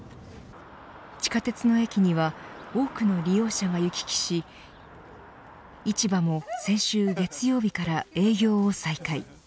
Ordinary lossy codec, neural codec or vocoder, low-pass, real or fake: none; none; none; real